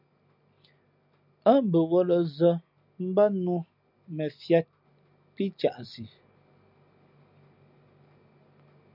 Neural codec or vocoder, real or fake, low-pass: none; real; 5.4 kHz